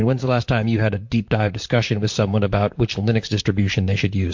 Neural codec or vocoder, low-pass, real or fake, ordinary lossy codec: vocoder, 44.1 kHz, 128 mel bands, Pupu-Vocoder; 7.2 kHz; fake; MP3, 48 kbps